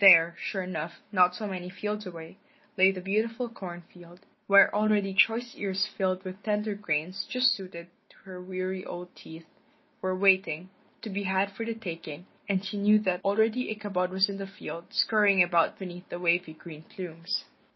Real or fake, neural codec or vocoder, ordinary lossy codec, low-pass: real; none; MP3, 24 kbps; 7.2 kHz